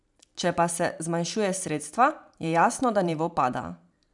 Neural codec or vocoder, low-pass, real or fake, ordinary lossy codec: vocoder, 44.1 kHz, 128 mel bands every 512 samples, BigVGAN v2; 10.8 kHz; fake; none